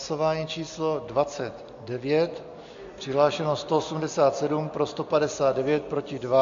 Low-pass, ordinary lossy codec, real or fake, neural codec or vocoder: 7.2 kHz; AAC, 64 kbps; real; none